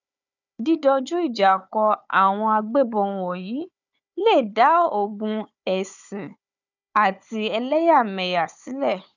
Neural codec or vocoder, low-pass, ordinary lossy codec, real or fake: codec, 16 kHz, 4 kbps, FunCodec, trained on Chinese and English, 50 frames a second; 7.2 kHz; none; fake